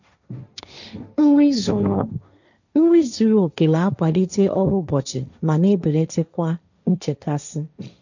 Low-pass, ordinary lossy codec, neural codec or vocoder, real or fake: 7.2 kHz; none; codec, 16 kHz, 1.1 kbps, Voila-Tokenizer; fake